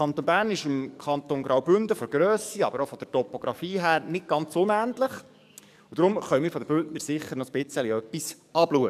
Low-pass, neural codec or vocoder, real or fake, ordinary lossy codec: 14.4 kHz; codec, 44.1 kHz, 7.8 kbps, DAC; fake; none